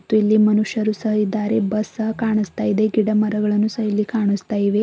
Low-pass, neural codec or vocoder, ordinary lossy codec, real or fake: none; none; none; real